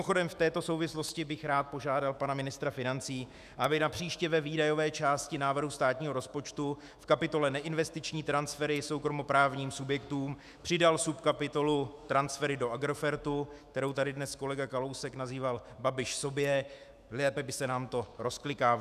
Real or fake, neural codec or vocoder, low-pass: fake; autoencoder, 48 kHz, 128 numbers a frame, DAC-VAE, trained on Japanese speech; 14.4 kHz